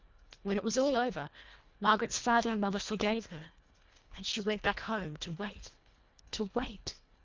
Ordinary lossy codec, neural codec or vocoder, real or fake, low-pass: Opus, 24 kbps; codec, 24 kHz, 1.5 kbps, HILCodec; fake; 7.2 kHz